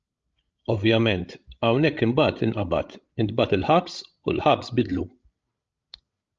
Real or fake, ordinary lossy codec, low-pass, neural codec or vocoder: fake; Opus, 24 kbps; 7.2 kHz; codec, 16 kHz, 16 kbps, FreqCodec, larger model